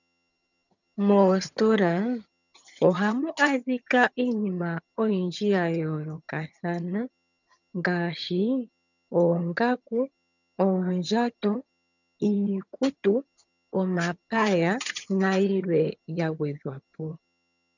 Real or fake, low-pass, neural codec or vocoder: fake; 7.2 kHz; vocoder, 22.05 kHz, 80 mel bands, HiFi-GAN